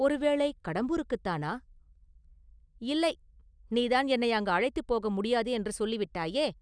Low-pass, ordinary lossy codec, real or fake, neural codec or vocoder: 9.9 kHz; none; real; none